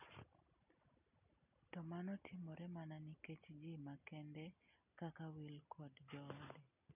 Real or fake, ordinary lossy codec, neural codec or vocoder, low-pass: real; none; none; 3.6 kHz